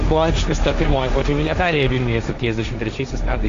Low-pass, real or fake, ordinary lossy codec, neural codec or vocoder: 7.2 kHz; fake; AAC, 64 kbps; codec, 16 kHz, 1.1 kbps, Voila-Tokenizer